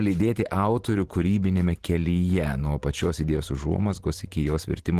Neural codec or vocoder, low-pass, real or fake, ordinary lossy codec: vocoder, 44.1 kHz, 128 mel bands every 512 samples, BigVGAN v2; 14.4 kHz; fake; Opus, 16 kbps